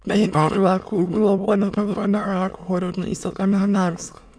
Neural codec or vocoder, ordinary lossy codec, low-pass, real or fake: autoencoder, 22.05 kHz, a latent of 192 numbers a frame, VITS, trained on many speakers; none; none; fake